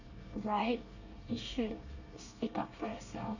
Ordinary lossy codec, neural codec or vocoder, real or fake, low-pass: none; codec, 24 kHz, 1 kbps, SNAC; fake; 7.2 kHz